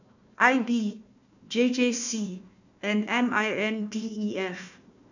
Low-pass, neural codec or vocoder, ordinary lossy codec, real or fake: 7.2 kHz; codec, 16 kHz, 1 kbps, FunCodec, trained on Chinese and English, 50 frames a second; none; fake